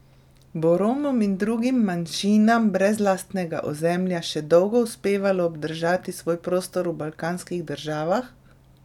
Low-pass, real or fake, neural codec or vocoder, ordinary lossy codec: 19.8 kHz; real; none; none